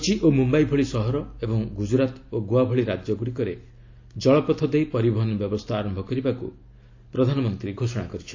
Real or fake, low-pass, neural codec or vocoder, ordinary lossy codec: real; 7.2 kHz; none; AAC, 48 kbps